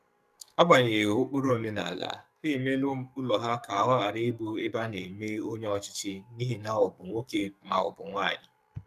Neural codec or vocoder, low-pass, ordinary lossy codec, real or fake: codec, 44.1 kHz, 2.6 kbps, SNAC; 14.4 kHz; none; fake